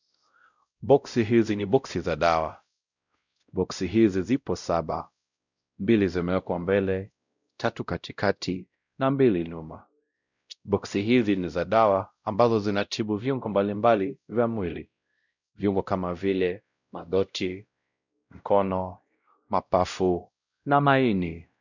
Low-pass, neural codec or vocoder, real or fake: 7.2 kHz; codec, 16 kHz, 0.5 kbps, X-Codec, WavLM features, trained on Multilingual LibriSpeech; fake